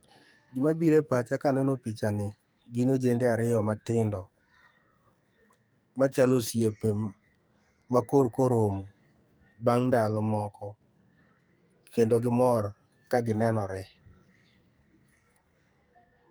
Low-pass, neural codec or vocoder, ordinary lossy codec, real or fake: none; codec, 44.1 kHz, 2.6 kbps, SNAC; none; fake